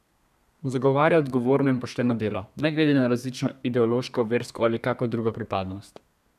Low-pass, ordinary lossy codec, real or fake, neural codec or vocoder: 14.4 kHz; none; fake; codec, 32 kHz, 1.9 kbps, SNAC